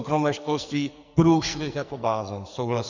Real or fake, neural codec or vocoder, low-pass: fake; codec, 44.1 kHz, 2.6 kbps, SNAC; 7.2 kHz